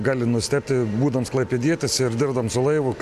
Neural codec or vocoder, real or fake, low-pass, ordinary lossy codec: none; real; 14.4 kHz; AAC, 96 kbps